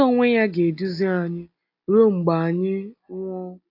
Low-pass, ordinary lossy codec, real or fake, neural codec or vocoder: 5.4 kHz; AAC, 32 kbps; real; none